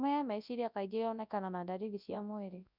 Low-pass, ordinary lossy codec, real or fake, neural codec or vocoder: 5.4 kHz; none; fake; codec, 24 kHz, 0.9 kbps, WavTokenizer, large speech release